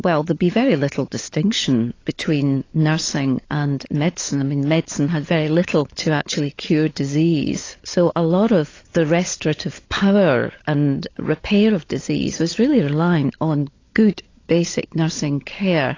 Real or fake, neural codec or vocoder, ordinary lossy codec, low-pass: fake; codec, 16 kHz, 16 kbps, FunCodec, trained on LibriTTS, 50 frames a second; AAC, 32 kbps; 7.2 kHz